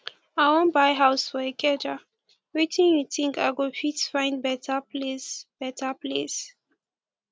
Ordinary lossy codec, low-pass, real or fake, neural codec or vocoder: none; none; real; none